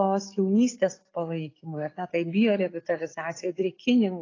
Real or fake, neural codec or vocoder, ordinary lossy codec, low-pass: real; none; AAC, 32 kbps; 7.2 kHz